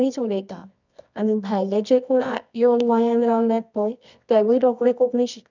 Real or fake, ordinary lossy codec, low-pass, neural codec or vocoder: fake; none; 7.2 kHz; codec, 24 kHz, 0.9 kbps, WavTokenizer, medium music audio release